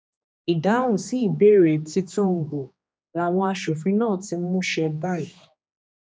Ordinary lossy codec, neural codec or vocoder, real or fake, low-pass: none; codec, 16 kHz, 2 kbps, X-Codec, HuBERT features, trained on general audio; fake; none